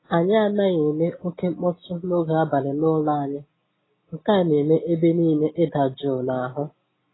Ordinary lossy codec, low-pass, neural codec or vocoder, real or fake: AAC, 16 kbps; 7.2 kHz; none; real